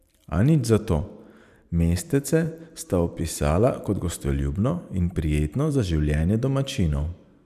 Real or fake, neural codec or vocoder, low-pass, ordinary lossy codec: fake; vocoder, 44.1 kHz, 128 mel bands every 512 samples, BigVGAN v2; 14.4 kHz; none